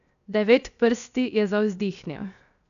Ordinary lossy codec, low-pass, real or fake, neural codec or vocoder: none; 7.2 kHz; fake; codec, 16 kHz, 0.7 kbps, FocalCodec